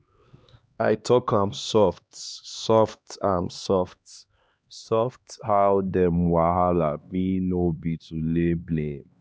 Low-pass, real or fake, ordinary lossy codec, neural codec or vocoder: none; fake; none; codec, 16 kHz, 2 kbps, X-Codec, HuBERT features, trained on LibriSpeech